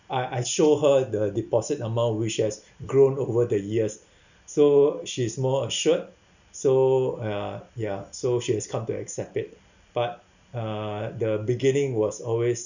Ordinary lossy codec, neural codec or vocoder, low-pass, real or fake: none; none; 7.2 kHz; real